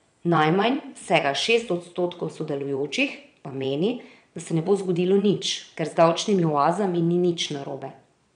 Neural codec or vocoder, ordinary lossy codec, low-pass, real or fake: vocoder, 22.05 kHz, 80 mel bands, Vocos; none; 9.9 kHz; fake